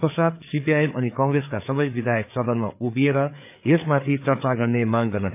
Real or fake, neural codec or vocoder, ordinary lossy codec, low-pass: fake; codec, 16 kHz, 4 kbps, FreqCodec, larger model; AAC, 32 kbps; 3.6 kHz